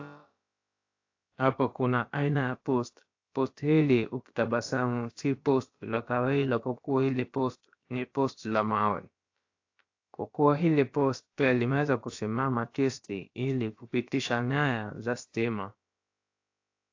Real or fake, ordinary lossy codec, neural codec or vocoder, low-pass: fake; AAC, 48 kbps; codec, 16 kHz, about 1 kbps, DyCAST, with the encoder's durations; 7.2 kHz